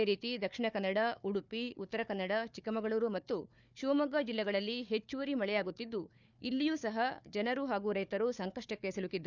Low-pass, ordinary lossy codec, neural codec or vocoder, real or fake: 7.2 kHz; none; codec, 16 kHz, 4 kbps, FunCodec, trained on Chinese and English, 50 frames a second; fake